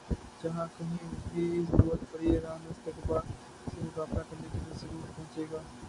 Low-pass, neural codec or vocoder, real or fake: 10.8 kHz; none; real